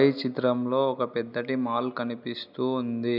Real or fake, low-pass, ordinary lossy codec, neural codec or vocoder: real; 5.4 kHz; none; none